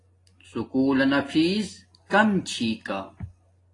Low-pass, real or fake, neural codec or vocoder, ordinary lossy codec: 10.8 kHz; real; none; AAC, 32 kbps